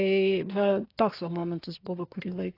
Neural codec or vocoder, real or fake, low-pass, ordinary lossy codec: codec, 24 kHz, 1 kbps, SNAC; fake; 5.4 kHz; AAC, 32 kbps